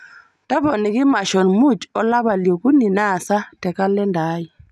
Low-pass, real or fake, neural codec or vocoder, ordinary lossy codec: none; real; none; none